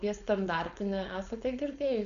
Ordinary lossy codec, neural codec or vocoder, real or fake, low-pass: AAC, 96 kbps; codec, 16 kHz, 4.8 kbps, FACodec; fake; 7.2 kHz